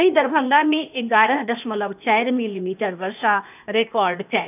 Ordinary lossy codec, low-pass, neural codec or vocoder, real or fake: none; 3.6 kHz; codec, 16 kHz, 0.8 kbps, ZipCodec; fake